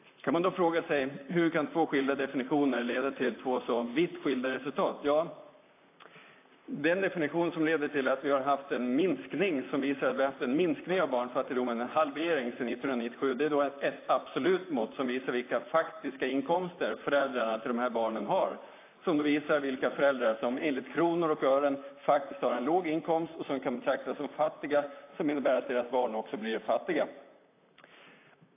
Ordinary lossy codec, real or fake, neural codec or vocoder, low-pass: AAC, 24 kbps; fake; vocoder, 44.1 kHz, 128 mel bands, Pupu-Vocoder; 3.6 kHz